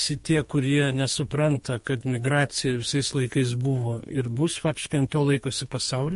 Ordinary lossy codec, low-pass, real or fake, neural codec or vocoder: MP3, 48 kbps; 14.4 kHz; fake; codec, 44.1 kHz, 2.6 kbps, SNAC